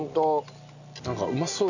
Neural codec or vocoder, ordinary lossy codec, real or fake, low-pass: none; none; real; 7.2 kHz